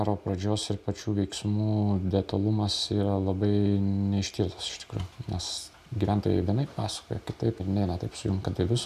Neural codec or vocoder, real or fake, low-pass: none; real; 14.4 kHz